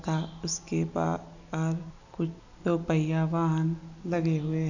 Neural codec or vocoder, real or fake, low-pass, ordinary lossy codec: none; real; 7.2 kHz; none